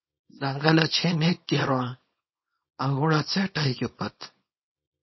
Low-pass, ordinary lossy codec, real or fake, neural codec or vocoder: 7.2 kHz; MP3, 24 kbps; fake; codec, 24 kHz, 0.9 kbps, WavTokenizer, small release